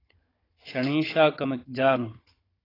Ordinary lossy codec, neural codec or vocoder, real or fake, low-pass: AAC, 24 kbps; codec, 16 kHz, 16 kbps, FunCodec, trained on Chinese and English, 50 frames a second; fake; 5.4 kHz